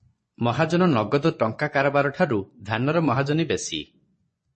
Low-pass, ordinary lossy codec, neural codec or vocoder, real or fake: 10.8 kHz; MP3, 32 kbps; vocoder, 24 kHz, 100 mel bands, Vocos; fake